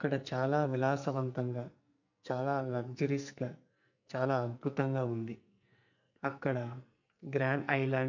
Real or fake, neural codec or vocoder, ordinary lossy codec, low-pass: fake; codec, 44.1 kHz, 2.6 kbps, SNAC; none; 7.2 kHz